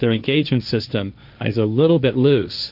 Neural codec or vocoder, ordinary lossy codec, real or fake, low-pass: codec, 16 kHz, 1.1 kbps, Voila-Tokenizer; AAC, 48 kbps; fake; 5.4 kHz